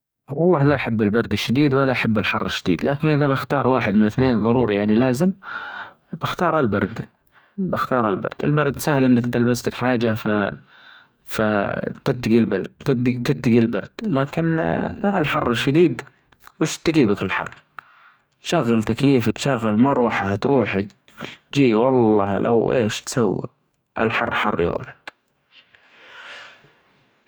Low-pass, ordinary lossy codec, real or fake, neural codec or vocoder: none; none; fake; codec, 44.1 kHz, 2.6 kbps, DAC